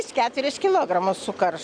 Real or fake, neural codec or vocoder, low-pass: real; none; 9.9 kHz